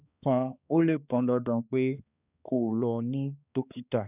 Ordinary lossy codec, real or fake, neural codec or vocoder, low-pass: none; fake; codec, 16 kHz, 2 kbps, X-Codec, HuBERT features, trained on balanced general audio; 3.6 kHz